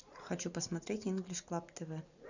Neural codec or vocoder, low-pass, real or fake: none; 7.2 kHz; real